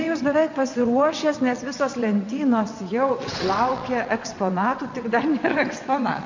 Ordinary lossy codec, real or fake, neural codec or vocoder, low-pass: MP3, 48 kbps; fake; vocoder, 22.05 kHz, 80 mel bands, WaveNeXt; 7.2 kHz